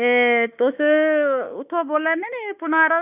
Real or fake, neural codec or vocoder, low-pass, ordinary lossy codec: fake; autoencoder, 48 kHz, 32 numbers a frame, DAC-VAE, trained on Japanese speech; 3.6 kHz; none